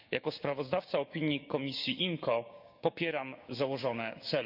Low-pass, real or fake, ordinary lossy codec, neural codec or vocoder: 5.4 kHz; fake; none; codec, 16 kHz, 6 kbps, DAC